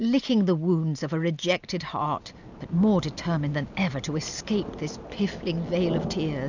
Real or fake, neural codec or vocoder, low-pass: real; none; 7.2 kHz